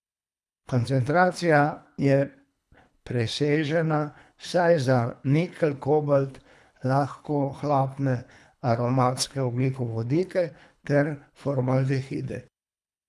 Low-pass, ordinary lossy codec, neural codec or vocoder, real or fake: none; none; codec, 24 kHz, 3 kbps, HILCodec; fake